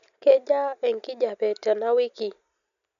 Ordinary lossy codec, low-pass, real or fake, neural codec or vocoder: none; 7.2 kHz; real; none